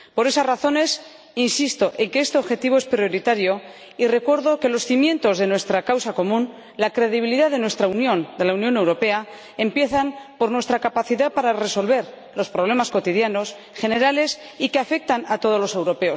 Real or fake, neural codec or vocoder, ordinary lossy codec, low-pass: real; none; none; none